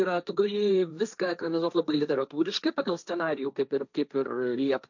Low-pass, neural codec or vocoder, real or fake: 7.2 kHz; codec, 16 kHz, 1.1 kbps, Voila-Tokenizer; fake